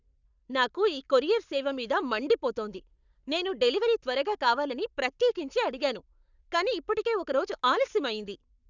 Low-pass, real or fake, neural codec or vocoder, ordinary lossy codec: 7.2 kHz; fake; codec, 44.1 kHz, 7.8 kbps, Pupu-Codec; none